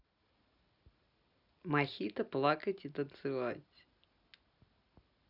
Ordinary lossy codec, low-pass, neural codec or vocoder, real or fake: none; 5.4 kHz; none; real